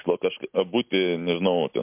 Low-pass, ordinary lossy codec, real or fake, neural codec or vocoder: 3.6 kHz; MP3, 32 kbps; real; none